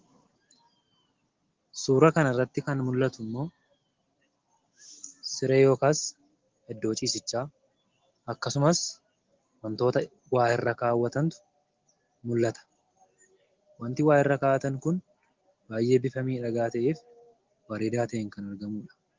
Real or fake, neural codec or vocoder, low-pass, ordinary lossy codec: real; none; 7.2 kHz; Opus, 16 kbps